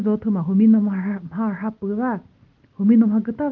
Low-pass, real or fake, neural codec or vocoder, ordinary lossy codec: 7.2 kHz; real; none; Opus, 32 kbps